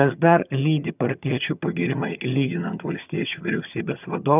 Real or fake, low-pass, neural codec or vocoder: fake; 3.6 kHz; vocoder, 22.05 kHz, 80 mel bands, HiFi-GAN